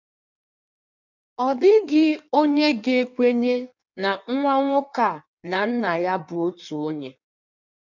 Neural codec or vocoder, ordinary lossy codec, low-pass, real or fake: codec, 16 kHz in and 24 kHz out, 1.1 kbps, FireRedTTS-2 codec; none; 7.2 kHz; fake